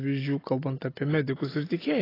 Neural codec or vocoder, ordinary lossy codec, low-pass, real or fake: none; AAC, 24 kbps; 5.4 kHz; real